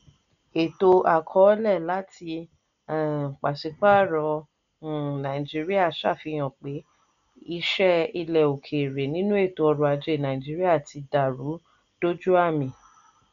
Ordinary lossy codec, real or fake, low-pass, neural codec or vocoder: none; real; 7.2 kHz; none